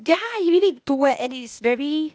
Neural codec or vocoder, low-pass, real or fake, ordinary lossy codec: codec, 16 kHz, 0.8 kbps, ZipCodec; none; fake; none